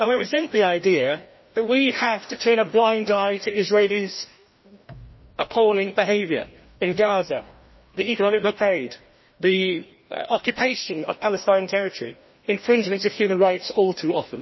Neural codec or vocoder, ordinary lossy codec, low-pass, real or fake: codec, 16 kHz, 1 kbps, FreqCodec, larger model; MP3, 24 kbps; 7.2 kHz; fake